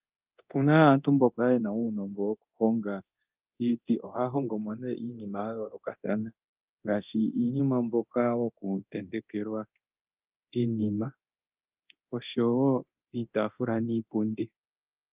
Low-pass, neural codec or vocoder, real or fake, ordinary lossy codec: 3.6 kHz; codec, 24 kHz, 0.9 kbps, DualCodec; fake; Opus, 24 kbps